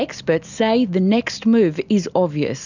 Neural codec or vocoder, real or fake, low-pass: none; real; 7.2 kHz